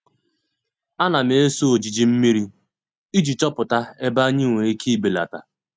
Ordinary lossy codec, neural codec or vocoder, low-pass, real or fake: none; none; none; real